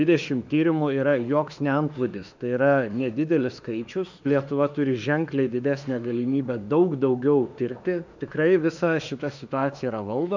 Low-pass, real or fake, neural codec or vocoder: 7.2 kHz; fake; autoencoder, 48 kHz, 32 numbers a frame, DAC-VAE, trained on Japanese speech